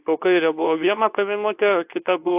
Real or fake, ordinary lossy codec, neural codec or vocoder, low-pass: fake; AAC, 32 kbps; codec, 24 kHz, 0.9 kbps, WavTokenizer, medium speech release version 2; 3.6 kHz